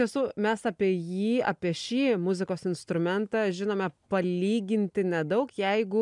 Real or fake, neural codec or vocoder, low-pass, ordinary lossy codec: real; none; 10.8 kHz; MP3, 96 kbps